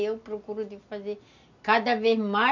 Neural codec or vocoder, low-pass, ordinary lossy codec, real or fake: none; 7.2 kHz; none; real